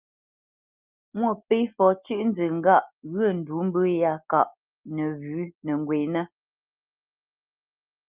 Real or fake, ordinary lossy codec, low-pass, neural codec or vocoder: real; Opus, 24 kbps; 3.6 kHz; none